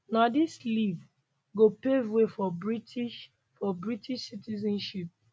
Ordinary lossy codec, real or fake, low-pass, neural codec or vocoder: none; real; none; none